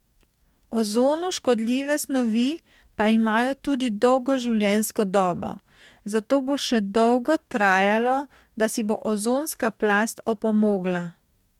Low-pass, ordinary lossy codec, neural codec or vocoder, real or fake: 19.8 kHz; MP3, 96 kbps; codec, 44.1 kHz, 2.6 kbps, DAC; fake